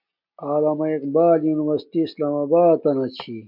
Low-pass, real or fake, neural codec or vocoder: 5.4 kHz; real; none